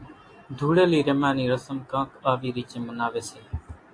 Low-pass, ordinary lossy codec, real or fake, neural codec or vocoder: 9.9 kHz; AAC, 48 kbps; real; none